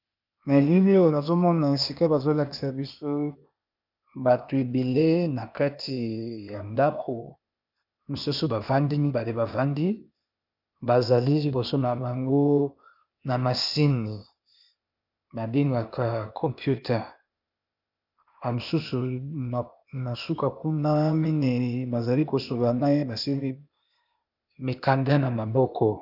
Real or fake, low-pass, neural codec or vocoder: fake; 5.4 kHz; codec, 16 kHz, 0.8 kbps, ZipCodec